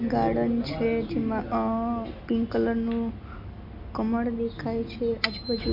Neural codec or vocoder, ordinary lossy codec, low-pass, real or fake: none; MP3, 32 kbps; 5.4 kHz; real